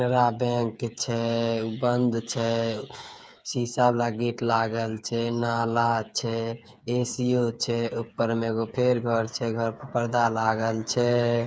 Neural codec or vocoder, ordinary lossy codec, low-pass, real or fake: codec, 16 kHz, 8 kbps, FreqCodec, smaller model; none; none; fake